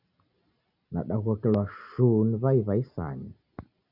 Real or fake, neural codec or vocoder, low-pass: real; none; 5.4 kHz